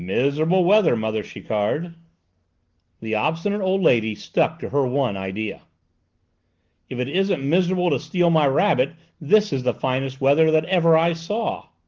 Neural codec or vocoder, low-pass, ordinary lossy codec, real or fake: none; 7.2 kHz; Opus, 16 kbps; real